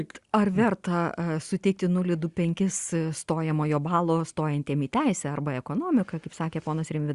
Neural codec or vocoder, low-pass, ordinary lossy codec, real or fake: none; 10.8 kHz; Opus, 64 kbps; real